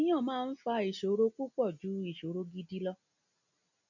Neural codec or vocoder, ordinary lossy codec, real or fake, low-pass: none; none; real; 7.2 kHz